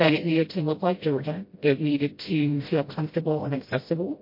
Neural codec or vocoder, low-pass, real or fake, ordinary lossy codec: codec, 16 kHz, 0.5 kbps, FreqCodec, smaller model; 5.4 kHz; fake; MP3, 32 kbps